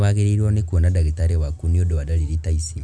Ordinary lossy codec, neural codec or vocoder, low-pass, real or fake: none; none; none; real